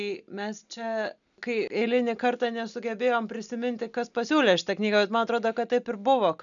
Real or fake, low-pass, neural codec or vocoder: real; 7.2 kHz; none